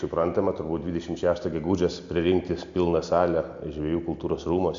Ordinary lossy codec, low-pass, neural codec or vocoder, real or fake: AAC, 64 kbps; 7.2 kHz; none; real